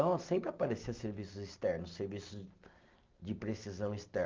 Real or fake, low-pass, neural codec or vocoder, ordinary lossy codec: real; 7.2 kHz; none; Opus, 24 kbps